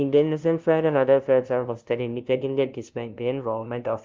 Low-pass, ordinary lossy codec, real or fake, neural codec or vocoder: 7.2 kHz; Opus, 32 kbps; fake; codec, 16 kHz, 0.5 kbps, FunCodec, trained on LibriTTS, 25 frames a second